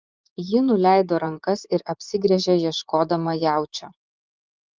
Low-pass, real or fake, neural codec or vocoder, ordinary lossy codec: 7.2 kHz; fake; vocoder, 24 kHz, 100 mel bands, Vocos; Opus, 24 kbps